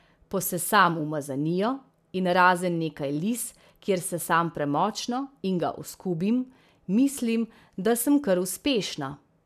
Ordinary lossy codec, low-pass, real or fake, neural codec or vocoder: none; 14.4 kHz; real; none